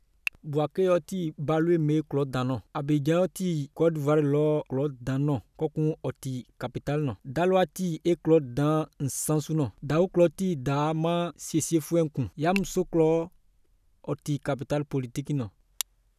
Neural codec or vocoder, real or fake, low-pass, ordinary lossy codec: none; real; 14.4 kHz; none